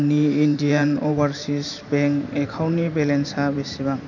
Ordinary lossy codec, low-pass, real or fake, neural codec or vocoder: none; 7.2 kHz; fake; vocoder, 44.1 kHz, 128 mel bands every 512 samples, BigVGAN v2